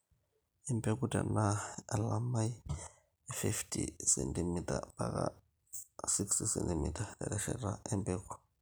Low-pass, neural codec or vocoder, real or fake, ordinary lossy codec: none; none; real; none